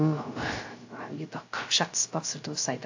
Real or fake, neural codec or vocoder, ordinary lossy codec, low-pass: fake; codec, 16 kHz, 0.3 kbps, FocalCodec; AAC, 48 kbps; 7.2 kHz